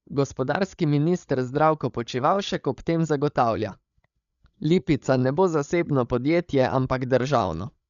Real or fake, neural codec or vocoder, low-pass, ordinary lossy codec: fake; codec, 16 kHz, 8 kbps, FreqCodec, larger model; 7.2 kHz; none